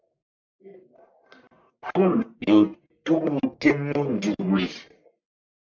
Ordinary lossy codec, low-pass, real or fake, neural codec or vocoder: MP3, 64 kbps; 7.2 kHz; fake; codec, 44.1 kHz, 1.7 kbps, Pupu-Codec